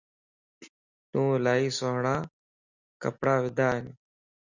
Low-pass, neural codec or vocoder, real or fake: 7.2 kHz; none; real